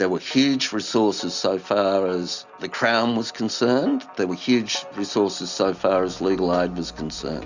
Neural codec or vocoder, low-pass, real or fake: none; 7.2 kHz; real